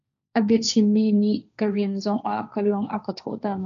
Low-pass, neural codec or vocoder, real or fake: 7.2 kHz; codec, 16 kHz, 1.1 kbps, Voila-Tokenizer; fake